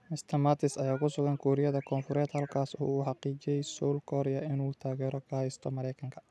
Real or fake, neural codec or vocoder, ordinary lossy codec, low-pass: real; none; none; none